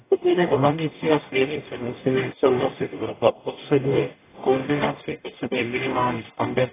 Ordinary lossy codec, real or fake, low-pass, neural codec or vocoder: AAC, 16 kbps; fake; 3.6 kHz; codec, 44.1 kHz, 0.9 kbps, DAC